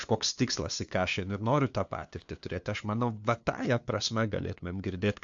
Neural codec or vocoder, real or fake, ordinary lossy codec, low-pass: codec, 16 kHz, 2 kbps, FunCodec, trained on LibriTTS, 25 frames a second; fake; AAC, 64 kbps; 7.2 kHz